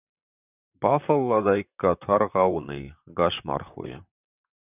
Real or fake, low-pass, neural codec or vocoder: fake; 3.6 kHz; vocoder, 44.1 kHz, 128 mel bands, Pupu-Vocoder